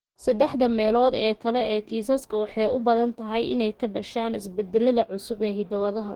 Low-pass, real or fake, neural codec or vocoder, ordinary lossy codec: 19.8 kHz; fake; codec, 44.1 kHz, 2.6 kbps, DAC; Opus, 24 kbps